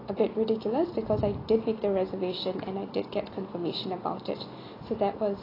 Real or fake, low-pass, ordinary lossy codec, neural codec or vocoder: real; 5.4 kHz; AAC, 24 kbps; none